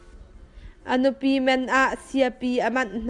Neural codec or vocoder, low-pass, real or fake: none; 10.8 kHz; real